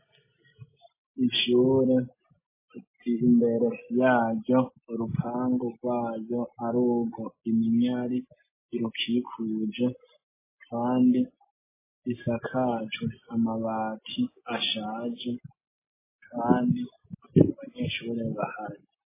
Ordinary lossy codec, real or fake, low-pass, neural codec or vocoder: MP3, 16 kbps; real; 3.6 kHz; none